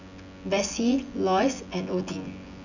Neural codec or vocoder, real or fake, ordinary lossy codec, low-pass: vocoder, 24 kHz, 100 mel bands, Vocos; fake; none; 7.2 kHz